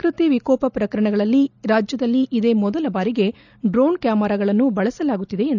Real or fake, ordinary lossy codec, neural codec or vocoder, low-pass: real; none; none; 7.2 kHz